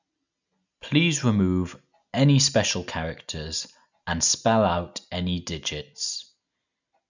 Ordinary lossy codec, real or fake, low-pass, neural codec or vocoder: none; real; 7.2 kHz; none